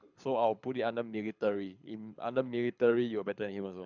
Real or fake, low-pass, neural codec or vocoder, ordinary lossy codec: fake; 7.2 kHz; codec, 24 kHz, 6 kbps, HILCodec; none